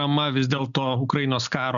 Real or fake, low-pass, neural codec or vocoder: real; 7.2 kHz; none